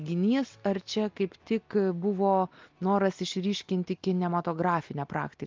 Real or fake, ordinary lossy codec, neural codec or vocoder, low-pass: real; Opus, 32 kbps; none; 7.2 kHz